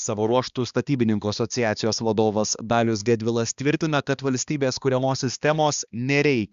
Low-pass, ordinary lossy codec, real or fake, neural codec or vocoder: 7.2 kHz; Opus, 64 kbps; fake; codec, 16 kHz, 2 kbps, X-Codec, HuBERT features, trained on balanced general audio